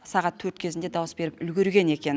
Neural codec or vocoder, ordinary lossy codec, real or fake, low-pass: none; none; real; none